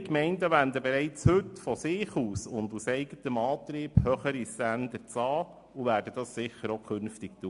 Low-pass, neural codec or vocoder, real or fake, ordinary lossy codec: 10.8 kHz; none; real; MP3, 64 kbps